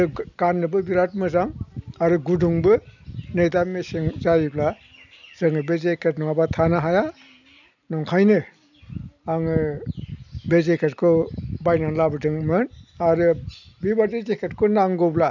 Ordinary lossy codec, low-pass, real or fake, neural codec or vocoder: none; 7.2 kHz; real; none